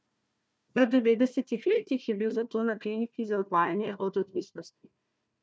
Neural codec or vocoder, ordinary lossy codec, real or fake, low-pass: codec, 16 kHz, 1 kbps, FunCodec, trained on Chinese and English, 50 frames a second; none; fake; none